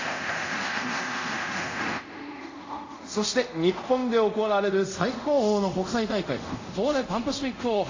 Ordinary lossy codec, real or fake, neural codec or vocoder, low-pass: AAC, 48 kbps; fake; codec, 24 kHz, 0.5 kbps, DualCodec; 7.2 kHz